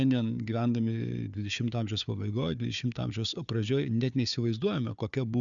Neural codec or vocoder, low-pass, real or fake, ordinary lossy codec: codec, 16 kHz, 4 kbps, FunCodec, trained on Chinese and English, 50 frames a second; 7.2 kHz; fake; MP3, 96 kbps